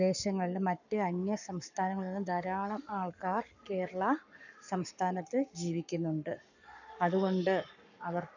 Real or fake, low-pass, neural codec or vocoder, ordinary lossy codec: fake; 7.2 kHz; codec, 44.1 kHz, 7.8 kbps, Pupu-Codec; none